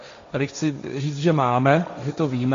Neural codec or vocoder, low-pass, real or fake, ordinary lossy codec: codec, 16 kHz, 1.1 kbps, Voila-Tokenizer; 7.2 kHz; fake; MP3, 48 kbps